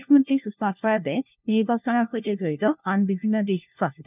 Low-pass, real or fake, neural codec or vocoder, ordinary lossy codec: 3.6 kHz; fake; codec, 16 kHz, 1 kbps, FunCodec, trained on LibriTTS, 50 frames a second; none